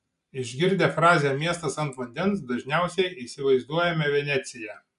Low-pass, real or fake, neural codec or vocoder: 10.8 kHz; real; none